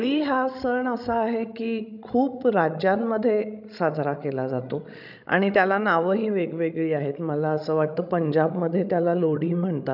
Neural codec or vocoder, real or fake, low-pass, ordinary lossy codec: codec, 16 kHz, 16 kbps, FreqCodec, larger model; fake; 5.4 kHz; none